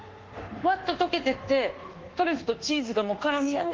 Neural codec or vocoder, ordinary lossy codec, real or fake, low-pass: codec, 24 kHz, 1.2 kbps, DualCodec; Opus, 16 kbps; fake; 7.2 kHz